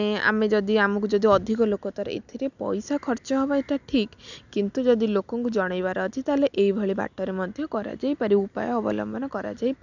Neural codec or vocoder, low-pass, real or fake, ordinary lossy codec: none; 7.2 kHz; real; none